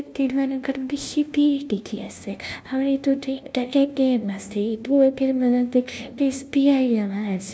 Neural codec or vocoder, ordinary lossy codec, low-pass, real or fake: codec, 16 kHz, 0.5 kbps, FunCodec, trained on LibriTTS, 25 frames a second; none; none; fake